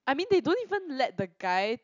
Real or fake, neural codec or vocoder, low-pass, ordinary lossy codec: real; none; 7.2 kHz; none